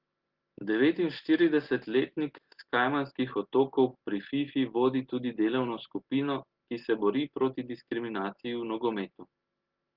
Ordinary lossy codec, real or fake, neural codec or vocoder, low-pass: Opus, 16 kbps; real; none; 5.4 kHz